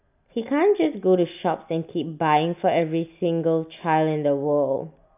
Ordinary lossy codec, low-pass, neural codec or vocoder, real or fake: none; 3.6 kHz; none; real